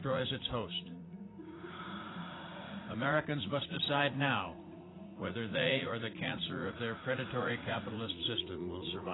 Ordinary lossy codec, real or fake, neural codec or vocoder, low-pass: AAC, 16 kbps; fake; vocoder, 44.1 kHz, 80 mel bands, Vocos; 7.2 kHz